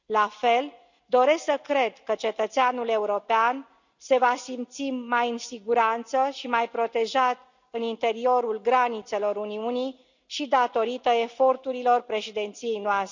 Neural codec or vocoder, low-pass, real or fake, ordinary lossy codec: none; 7.2 kHz; real; none